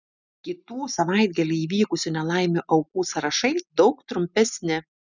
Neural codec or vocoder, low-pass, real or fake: none; 7.2 kHz; real